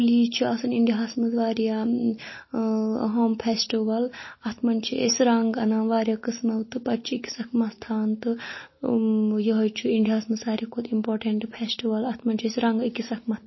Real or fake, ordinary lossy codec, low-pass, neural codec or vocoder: real; MP3, 24 kbps; 7.2 kHz; none